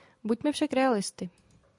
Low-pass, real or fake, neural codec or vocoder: 10.8 kHz; real; none